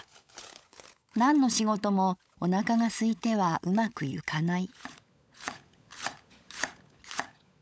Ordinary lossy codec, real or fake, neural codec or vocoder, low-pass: none; fake; codec, 16 kHz, 16 kbps, FunCodec, trained on LibriTTS, 50 frames a second; none